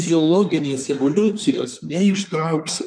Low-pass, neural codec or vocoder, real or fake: 9.9 kHz; codec, 24 kHz, 1 kbps, SNAC; fake